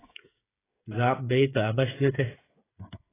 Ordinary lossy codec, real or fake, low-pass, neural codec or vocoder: AAC, 16 kbps; fake; 3.6 kHz; codec, 16 kHz, 8 kbps, FreqCodec, smaller model